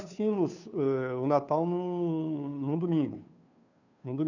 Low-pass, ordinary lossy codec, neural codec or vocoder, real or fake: 7.2 kHz; none; codec, 16 kHz, 2 kbps, FunCodec, trained on Chinese and English, 25 frames a second; fake